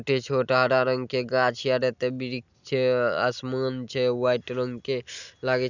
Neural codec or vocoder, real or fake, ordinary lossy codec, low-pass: none; real; none; 7.2 kHz